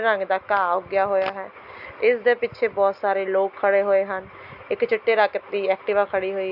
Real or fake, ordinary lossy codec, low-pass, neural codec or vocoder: real; AAC, 48 kbps; 5.4 kHz; none